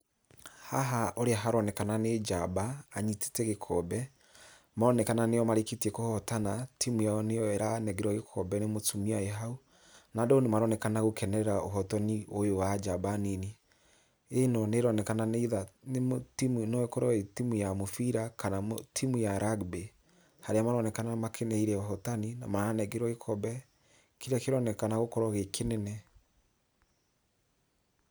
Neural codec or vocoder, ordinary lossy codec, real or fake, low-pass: none; none; real; none